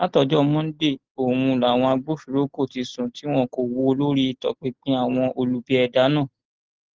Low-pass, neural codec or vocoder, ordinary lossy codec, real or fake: 7.2 kHz; none; Opus, 16 kbps; real